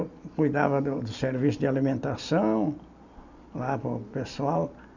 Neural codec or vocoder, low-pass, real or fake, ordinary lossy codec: none; 7.2 kHz; real; none